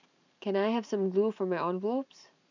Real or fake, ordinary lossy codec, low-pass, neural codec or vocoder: fake; none; 7.2 kHz; vocoder, 44.1 kHz, 128 mel bands every 512 samples, BigVGAN v2